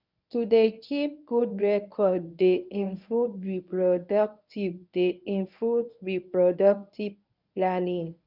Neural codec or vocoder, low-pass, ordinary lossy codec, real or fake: codec, 24 kHz, 0.9 kbps, WavTokenizer, medium speech release version 1; 5.4 kHz; none; fake